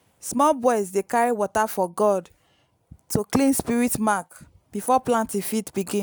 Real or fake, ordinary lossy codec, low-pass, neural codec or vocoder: real; none; none; none